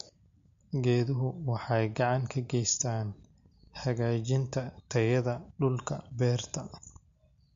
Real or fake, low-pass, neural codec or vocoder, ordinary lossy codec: real; 7.2 kHz; none; MP3, 48 kbps